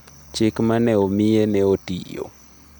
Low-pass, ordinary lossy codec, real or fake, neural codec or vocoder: none; none; real; none